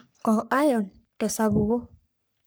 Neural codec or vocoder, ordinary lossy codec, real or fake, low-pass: codec, 44.1 kHz, 3.4 kbps, Pupu-Codec; none; fake; none